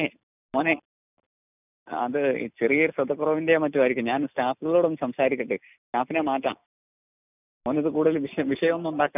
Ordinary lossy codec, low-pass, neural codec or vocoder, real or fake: none; 3.6 kHz; none; real